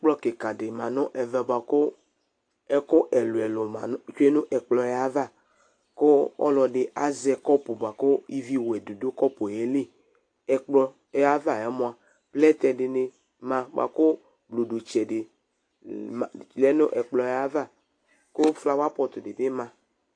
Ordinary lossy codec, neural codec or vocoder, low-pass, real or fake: AAC, 48 kbps; none; 9.9 kHz; real